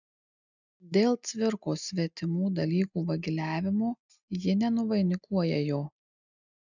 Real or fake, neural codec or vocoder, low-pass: real; none; 7.2 kHz